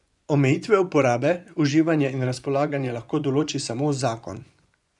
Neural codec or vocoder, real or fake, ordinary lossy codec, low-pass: vocoder, 24 kHz, 100 mel bands, Vocos; fake; none; 10.8 kHz